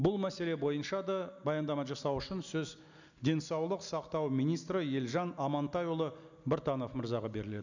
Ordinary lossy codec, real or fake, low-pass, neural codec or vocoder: none; real; 7.2 kHz; none